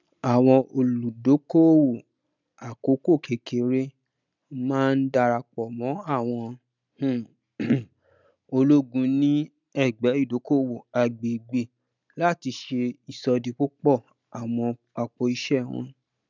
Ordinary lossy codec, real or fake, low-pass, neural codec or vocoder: none; real; 7.2 kHz; none